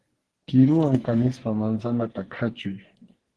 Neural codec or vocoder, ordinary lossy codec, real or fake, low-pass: codec, 44.1 kHz, 2.6 kbps, SNAC; Opus, 16 kbps; fake; 10.8 kHz